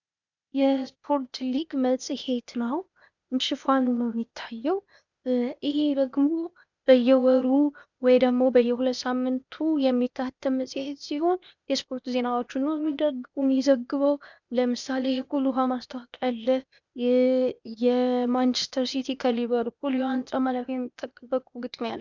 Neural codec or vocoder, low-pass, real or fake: codec, 16 kHz, 0.8 kbps, ZipCodec; 7.2 kHz; fake